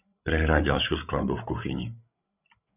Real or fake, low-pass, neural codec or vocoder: fake; 3.6 kHz; codec, 16 kHz, 16 kbps, FreqCodec, larger model